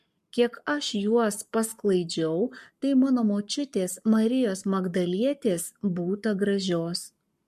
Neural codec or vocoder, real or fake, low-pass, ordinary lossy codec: codec, 44.1 kHz, 7.8 kbps, Pupu-Codec; fake; 14.4 kHz; MP3, 64 kbps